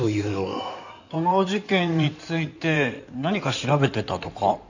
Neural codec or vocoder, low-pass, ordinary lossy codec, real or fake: codec, 16 kHz in and 24 kHz out, 2.2 kbps, FireRedTTS-2 codec; 7.2 kHz; none; fake